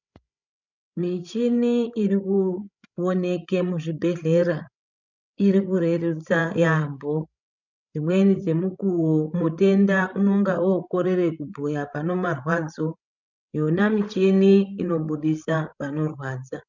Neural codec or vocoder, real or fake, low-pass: codec, 16 kHz, 16 kbps, FreqCodec, larger model; fake; 7.2 kHz